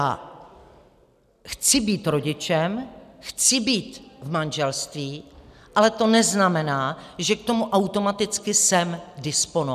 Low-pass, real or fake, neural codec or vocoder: 14.4 kHz; real; none